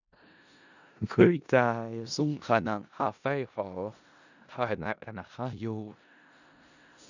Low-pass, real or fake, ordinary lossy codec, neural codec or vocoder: 7.2 kHz; fake; none; codec, 16 kHz in and 24 kHz out, 0.4 kbps, LongCat-Audio-Codec, four codebook decoder